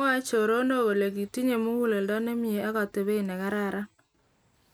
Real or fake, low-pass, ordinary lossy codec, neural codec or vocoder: real; none; none; none